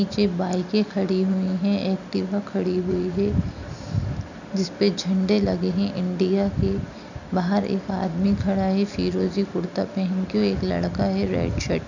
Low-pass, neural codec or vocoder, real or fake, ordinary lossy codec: 7.2 kHz; none; real; none